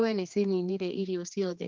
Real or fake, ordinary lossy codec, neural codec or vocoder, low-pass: fake; Opus, 24 kbps; codec, 16 kHz, 2 kbps, X-Codec, HuBERT features, trained on general audio; 7.2 kHz